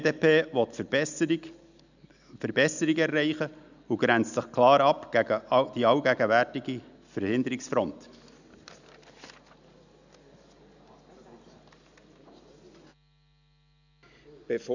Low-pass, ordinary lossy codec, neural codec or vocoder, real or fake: 7.2 kHz; none; none; real